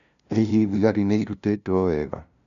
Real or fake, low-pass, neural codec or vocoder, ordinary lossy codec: fake; 7.2 kHz; codec, 16 kHz, 0.5 kbps, FunCodec, trained on LibriTTS, 25 frames a second; none